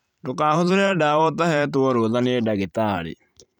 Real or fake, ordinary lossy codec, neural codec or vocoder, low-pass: fake; none; vocoder, 44.1 kHz, 128 mel bands every 512 samples, BigVGAN v2; 19.8 kHz